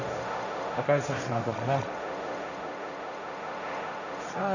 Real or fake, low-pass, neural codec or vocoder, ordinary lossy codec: fake; 7.2 kHz; codec, 16 kHz, 1.1 kbps, Voila-Tokenizer; none